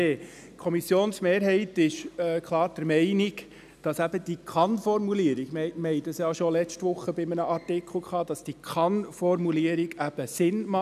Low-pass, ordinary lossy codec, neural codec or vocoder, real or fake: 14.4 kHz; none; none; real